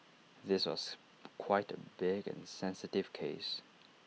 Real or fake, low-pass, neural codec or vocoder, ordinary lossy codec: real; none; none; none